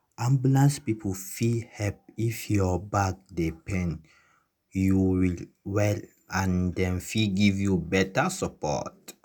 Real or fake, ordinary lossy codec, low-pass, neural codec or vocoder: real; none; none; none